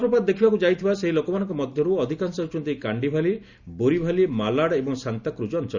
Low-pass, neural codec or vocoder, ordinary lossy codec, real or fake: 7.2 kHz; none; Opus, 64 kbps; real